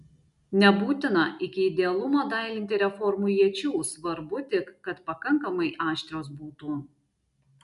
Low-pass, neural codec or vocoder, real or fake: 10.8 kHz; none; real